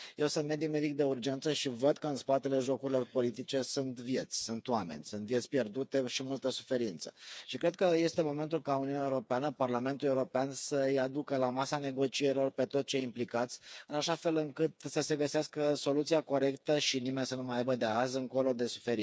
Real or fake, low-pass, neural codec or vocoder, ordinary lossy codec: fake; none; codec, 16 kHz, 4 kbps, FreqCodec, smaller model; none